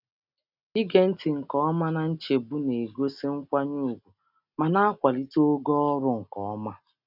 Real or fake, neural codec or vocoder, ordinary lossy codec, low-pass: real; none; none; 5.4 kHz